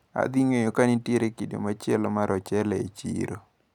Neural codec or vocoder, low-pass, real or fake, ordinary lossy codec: none; 19.8 kHz; real; none